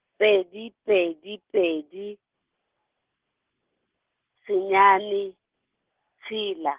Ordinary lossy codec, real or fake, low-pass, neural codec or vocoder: Opus, 16 kbps; real; 3.6 kHz; none